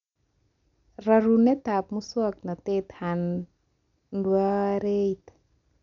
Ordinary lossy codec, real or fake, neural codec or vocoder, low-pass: none; real; none; 7.2 kHz